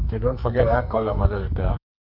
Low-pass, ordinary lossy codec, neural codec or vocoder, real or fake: 5.4 kHz; none; codec, 44.1 kHz, 2.6 kbps, SNAC; fake